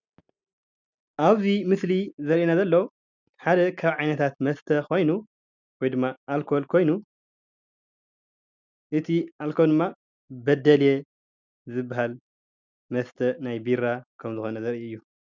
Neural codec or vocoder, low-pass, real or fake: none; 7.2 kHz; real